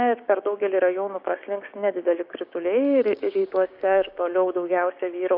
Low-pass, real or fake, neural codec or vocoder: 5.4 kHz; fake; codec, 16 kHz, 6 kbps, DAC